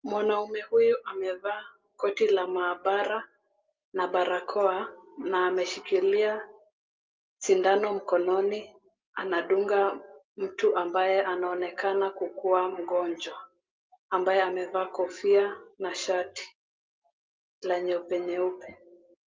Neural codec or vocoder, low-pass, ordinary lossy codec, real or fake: none; 7.2 kHz; Opus, 24 kbps; real